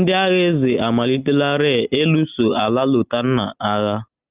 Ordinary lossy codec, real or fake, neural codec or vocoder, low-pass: Opus, 24 kbps; real; none; 3.6 kHz